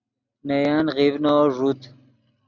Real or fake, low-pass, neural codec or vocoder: real; 7.2 kHz; none